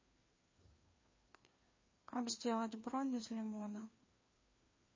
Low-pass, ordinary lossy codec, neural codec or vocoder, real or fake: 7.2 kHz; MP3, 32 kbps; codec, 16 kHz, 2 kbps, FreqCodec, larger model; fake